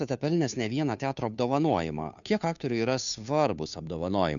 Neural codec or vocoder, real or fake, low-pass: codec, 16 kHz, 2 kbps, FunCodec, trained on Chinese and English, 25 frames a second; fake; 7.2 kHz